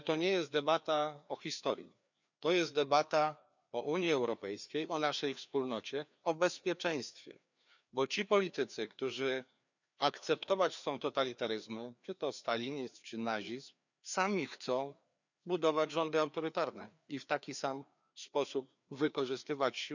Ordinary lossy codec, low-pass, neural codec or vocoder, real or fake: none; 7.2 kHz; codec, 16 kHz, 2 kbps, FreqCodec, larger model; fake